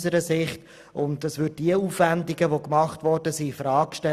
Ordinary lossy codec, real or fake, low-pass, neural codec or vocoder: Opus, 64 kbps; real; 14.4 kHz; none